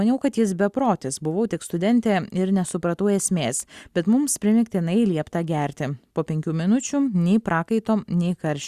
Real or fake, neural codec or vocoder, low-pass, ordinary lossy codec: real; none; 14.4 kHz; Opus, 64 kbps